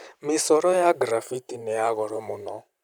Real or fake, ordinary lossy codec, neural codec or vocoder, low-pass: fake; none; vocoder, 44.1 kHz, 128 mel bands every 512 samples, BigVGAN v2; none